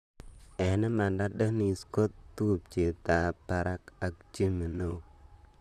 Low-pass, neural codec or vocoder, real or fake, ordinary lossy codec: 14.4 kHz; vocoder, 44.1 kHz, 128 mel bands, Pupu-Vocoder; fake; none